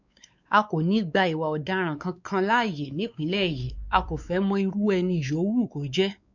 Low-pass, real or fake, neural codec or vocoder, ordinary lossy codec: 7.2 kHz; fake; codec, 16 kHz, 4 kbps, X-Codec, WavLM features, trained on Multilingual LibriSpeech; none